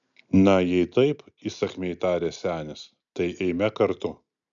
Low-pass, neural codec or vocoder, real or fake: 7.2 kHz; none; real